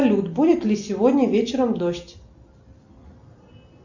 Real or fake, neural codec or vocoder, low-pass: real; none; 7.2 kHz